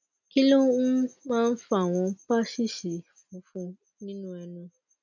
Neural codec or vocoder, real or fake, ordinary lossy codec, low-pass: none; real; none; 7.2 kHz